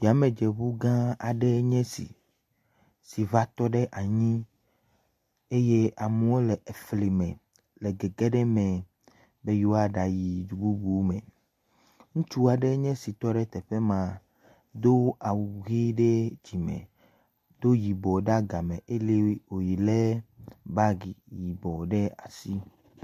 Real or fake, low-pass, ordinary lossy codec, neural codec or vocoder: real; 14.4 kHz; MP3, 64 kbps; none